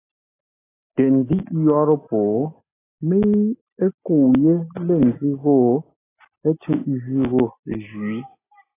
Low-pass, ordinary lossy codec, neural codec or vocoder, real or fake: 3.6 kHz; AAC, 16 kbps; none; real